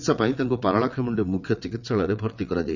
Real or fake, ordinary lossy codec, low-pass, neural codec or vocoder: fake; none; 7.2 kHz; vocoder, 22.05 kHz, 80 mel bands, WaveNeXt